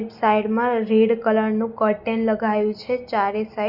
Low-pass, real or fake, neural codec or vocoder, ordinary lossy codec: 5.4 kHz; real; none; Opus, 64 kbps